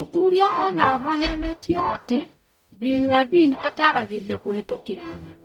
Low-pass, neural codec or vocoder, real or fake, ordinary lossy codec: 19.8 kHz; codec, 44.1 kHz, 0.9 kbps, DAC; fake; none